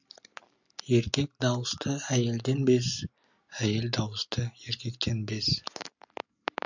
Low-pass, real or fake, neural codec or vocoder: 7.2 kHz; real; none